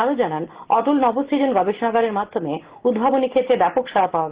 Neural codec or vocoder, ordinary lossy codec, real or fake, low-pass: none; Opus, 16 kbps; real; 3.6 kHz